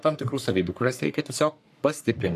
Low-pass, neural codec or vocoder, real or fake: 14.4 kHz; codec, 44.1 kHz, 3.4 kbps, Pupu-Codec; fake